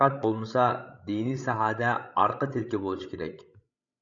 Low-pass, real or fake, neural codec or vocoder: 7.2 kHz; fake; codec, 16 kHz, 16 kbps, FreqCodec, larger model